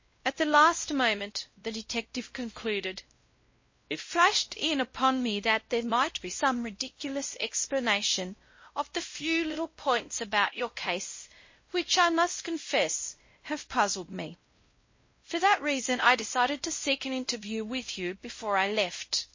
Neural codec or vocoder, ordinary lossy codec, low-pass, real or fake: codec, 16 kHz, 0.5 kbps, X-Codec, WavLM features, trained on Multilingual LibriSpeech; MP3, 32 kbps; 7.2 kHz; fake